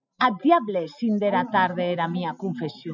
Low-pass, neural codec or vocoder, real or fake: 7.2 kHz; none; real